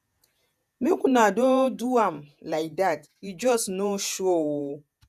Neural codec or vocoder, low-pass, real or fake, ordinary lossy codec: vocoder, 48 kHz, 128 mel bands, Vocos; 14.4 kHz; fake; none